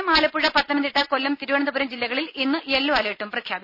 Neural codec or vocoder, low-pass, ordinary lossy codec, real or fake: none; 5.4 kHz; none; real